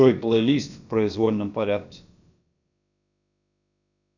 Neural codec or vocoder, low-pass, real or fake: codec, 16 kHz, about 1 kbps, DyCAST, with the encoder's durations; 7.2 kHz; fake